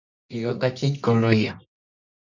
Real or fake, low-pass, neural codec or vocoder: fake; 7.2 kHz; codec, 24 kHz, 0.9 kbps, WavTokenizer, medium music audio release